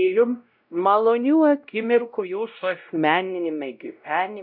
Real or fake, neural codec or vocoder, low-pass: fake; codec, 16 kHz, 0.5 kbps, X-Codec, WavLM features, trained on Multilingual LibriSpeech; 5.4 kHz